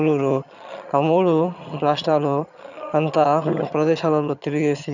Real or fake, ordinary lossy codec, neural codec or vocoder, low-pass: fake; none; vocoder, 22.05 kHz, 80 mel bands, HiFi-GAN; 7.2 kHz